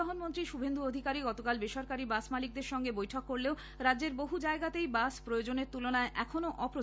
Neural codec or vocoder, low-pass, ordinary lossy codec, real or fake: none; none; none; real